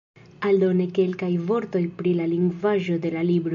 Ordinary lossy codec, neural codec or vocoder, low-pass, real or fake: MP3, 64 kbps; none; 7.2 kHz; real